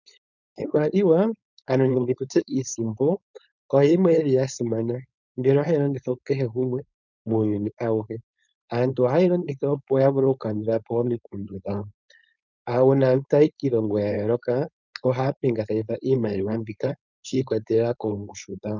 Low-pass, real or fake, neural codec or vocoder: 7.2 kHz; fake; codec, 16 kHz, 4.8 kbps, FACodec